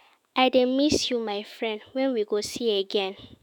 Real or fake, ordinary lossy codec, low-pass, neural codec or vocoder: fake; none; 19.8 kHz; autoencoder, 48 kHz, 128 numbers a frame, DAC-VAE, trained on Japanese speech